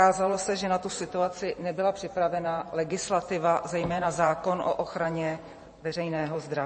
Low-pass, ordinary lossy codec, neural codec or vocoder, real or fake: 9.9 kHz; MP3, 32 kbps; vocoder, 44.1 kHz, 128 mel bands every 512 samples, BigVGAN v2; fake